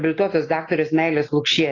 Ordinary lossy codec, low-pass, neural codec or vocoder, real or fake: AAC, 32 kbps; 7.2 kHz; none; real